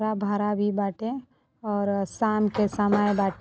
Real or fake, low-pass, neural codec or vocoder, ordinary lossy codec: real; none; none; none